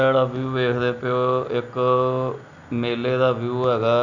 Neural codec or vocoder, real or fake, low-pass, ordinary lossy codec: none; real; 7.2 kHz; none